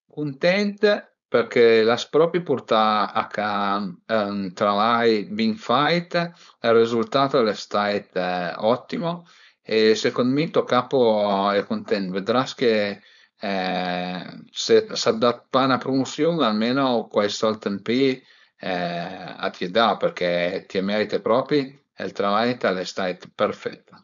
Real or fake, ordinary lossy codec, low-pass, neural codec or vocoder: fake; none; 7.2 kHz; codec, 16 kHz, 4.8 kbps, FACodec